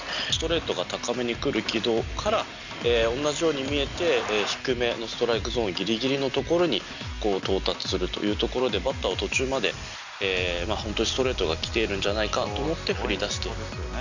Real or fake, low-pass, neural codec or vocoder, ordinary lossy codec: real; 7.2 kHz; none; none